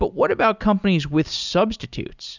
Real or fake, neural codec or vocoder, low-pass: real; none; 7.2 kHz